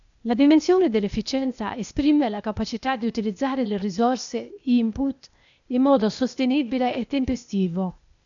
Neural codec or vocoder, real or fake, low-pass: codec, 16 kHz, 0.8 kbps, ZipCodec; fake; 7.2 kHz